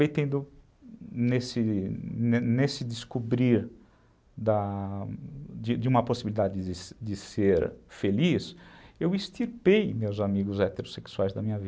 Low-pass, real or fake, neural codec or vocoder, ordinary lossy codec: none; real; none; none